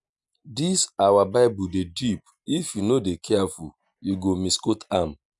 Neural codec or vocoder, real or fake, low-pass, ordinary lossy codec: none; real; 10.8 kHz; none